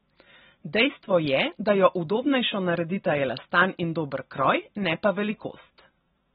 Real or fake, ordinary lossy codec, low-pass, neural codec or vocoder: real; AAC, 16 kbps; 7.2 kHz; none